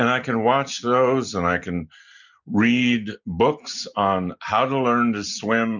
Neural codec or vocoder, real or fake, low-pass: none; real; 7.2 kHz